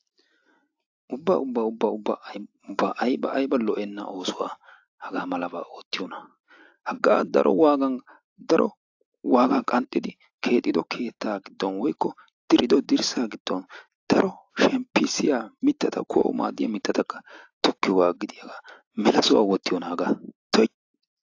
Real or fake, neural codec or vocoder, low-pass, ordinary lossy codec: real; none; 7.2 kHz; AAC, 48 kbps